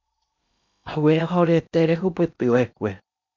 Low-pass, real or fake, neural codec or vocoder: 7.2 kHz; fake; codec, 16 kHz in and 24 kHz out, 0.8 kbps, FocalCodec, streaming, 65536 codes